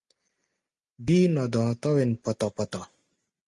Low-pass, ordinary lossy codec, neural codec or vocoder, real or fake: 10.8 kHz; Opus, 32 kbps; none; real